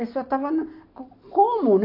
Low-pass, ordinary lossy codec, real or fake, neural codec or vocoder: 5.4 kHz; MP3, 32 kbps; real; none